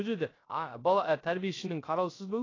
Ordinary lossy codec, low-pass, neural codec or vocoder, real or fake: AAC, 32 kbps; 7.2 kHz; codec, 16 kHz, 0.3 kbps, FocalCodec; fake